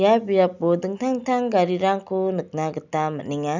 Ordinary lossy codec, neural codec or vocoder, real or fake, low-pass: none; none; real; 7.2 kHz